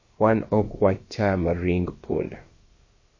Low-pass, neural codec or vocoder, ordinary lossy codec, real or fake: 7.2 kHz; codec, 16 kHz, about 1 kbps, DyCAST, with the encoder's durations; MP3, 32 kbps; fake